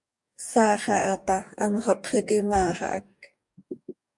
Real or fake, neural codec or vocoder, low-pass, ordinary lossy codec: fake; codec, 44.1 kHz, 2.6 kbps, DAC; 10.8 kHz; MP3, 64 kbps